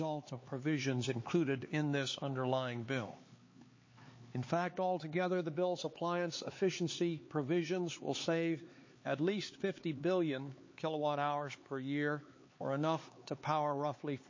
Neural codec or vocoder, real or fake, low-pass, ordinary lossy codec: codec, 16 kHz, 4 kbps, X-Codec, HuBERT features, trained on LibriSpeech; fake; 7.2 kHz; MP3, 32 kbps